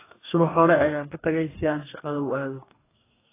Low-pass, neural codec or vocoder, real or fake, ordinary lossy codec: 3.6 kHz; codec, 44.1 kHz, 2.6 kbps, DAC; fake; AAC, 16 kbps